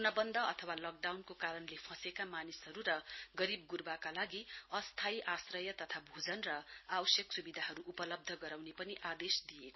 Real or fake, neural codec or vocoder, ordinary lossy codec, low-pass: real; none; MP3, 24 kbps; 7.2 kHz